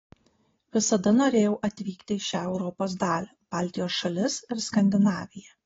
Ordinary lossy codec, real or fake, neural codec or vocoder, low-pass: AAC, 24 kbps; real; none; 7.2 kHz